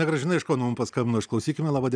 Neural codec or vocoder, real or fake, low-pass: none; real; 9.9 kHz